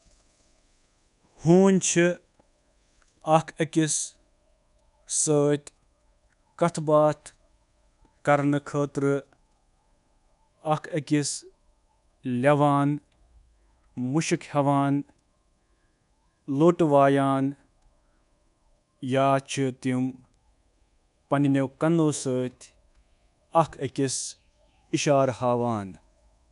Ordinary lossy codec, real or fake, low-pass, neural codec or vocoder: none; fake; 10.8 kHz; codec, 24 kHz, 1.2 kbps, DualCodec